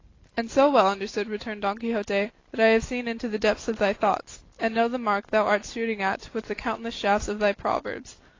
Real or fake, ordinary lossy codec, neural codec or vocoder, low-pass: real; AAC, 32 kbps; none; 7.2 kHz